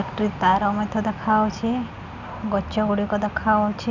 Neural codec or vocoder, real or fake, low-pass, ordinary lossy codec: none; real; 7.2 kHz; none